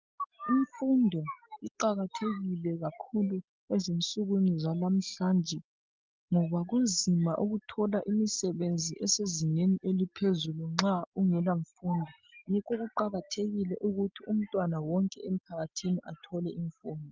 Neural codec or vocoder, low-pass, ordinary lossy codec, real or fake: none; 7.2 kHz; Opus, 24 kbps; real